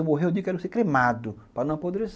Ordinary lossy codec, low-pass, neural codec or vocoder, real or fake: none; none; none; real